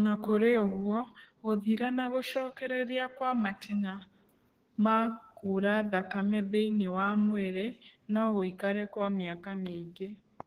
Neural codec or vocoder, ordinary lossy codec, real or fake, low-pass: codec, 32 kHz, 1.9 kbps, SNAC; Opus, 16 kbps; fake; 14.4 kHz